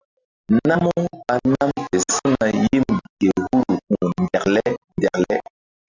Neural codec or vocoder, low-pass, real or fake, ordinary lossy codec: none; 7.2 kHz; real; Opus, 64 kbps